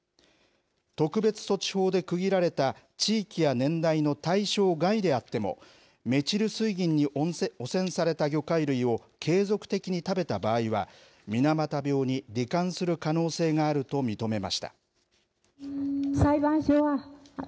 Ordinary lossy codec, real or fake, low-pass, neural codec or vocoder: none; real; none; none